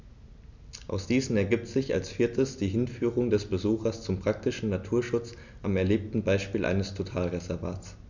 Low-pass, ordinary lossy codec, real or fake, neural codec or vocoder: 7.2 kHz; none; real; none